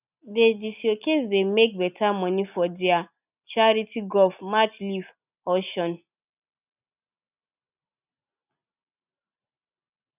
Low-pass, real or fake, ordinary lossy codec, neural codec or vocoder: 3.6 kHz; real; none; none